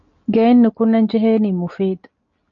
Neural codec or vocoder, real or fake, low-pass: none; real; 7.2 kHz